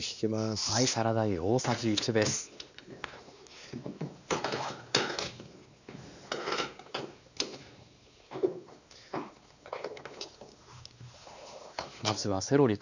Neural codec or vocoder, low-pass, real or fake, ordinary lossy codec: codec, 16 kHz, 2 kbps, X-Codec, WavLM features, trained on Multilingual LibriSpeech; 7.2 kHz; fake; none